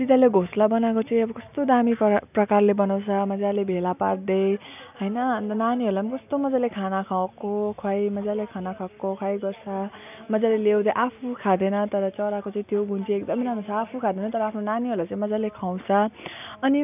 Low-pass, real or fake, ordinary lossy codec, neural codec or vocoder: 3.6 kHz; real; none; none